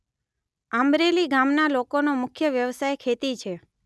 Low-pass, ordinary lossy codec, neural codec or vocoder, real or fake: none; none; none; real